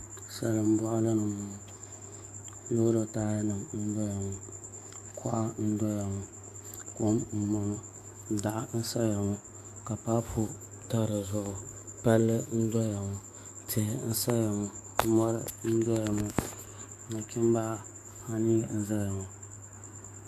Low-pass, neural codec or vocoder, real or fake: 14.4 kHz; codec, 44.1 kHz, 7.8 kbps, DAC; fake